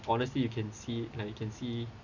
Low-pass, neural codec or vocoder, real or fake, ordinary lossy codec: 7.2 kHz; none; real; none